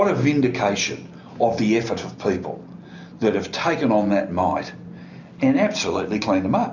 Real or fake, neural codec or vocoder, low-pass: real; none; 7.2 kHz